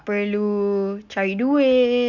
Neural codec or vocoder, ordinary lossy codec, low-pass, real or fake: none; none; 7.2 kHz; real